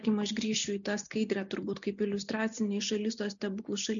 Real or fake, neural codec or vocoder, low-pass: real; none; 7.2 kHz